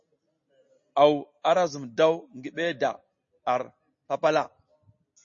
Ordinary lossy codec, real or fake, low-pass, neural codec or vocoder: MP3, 32 kbps; real; 7.2 kHz; none